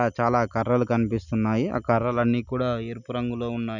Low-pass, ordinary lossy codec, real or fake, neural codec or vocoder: 7.2 kHz; none; real; none